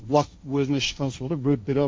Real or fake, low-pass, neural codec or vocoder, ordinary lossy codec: fake; none; codec, 16 kHz, 1.1 kbps, Voila-Tokenizer; none